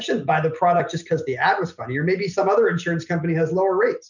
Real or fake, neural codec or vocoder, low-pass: real; none; 7.2 kHz